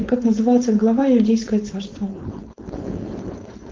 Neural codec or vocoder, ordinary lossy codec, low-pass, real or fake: codec, 16 kHz, 4.8 kbps, FACodec; Opus, 32 kbps; 7.2 kHz; fake